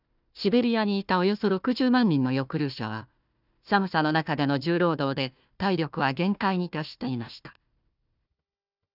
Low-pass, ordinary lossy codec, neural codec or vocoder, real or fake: 5.4 kHz; none; codec, 16 kHz, 1 kbps, FunCodec, trained on Chinese and English, 50 frames a second; fake